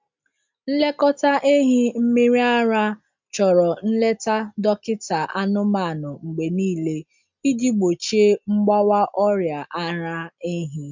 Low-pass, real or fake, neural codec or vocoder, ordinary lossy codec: 7.2 kHz; real; none; MP3, 64 kbps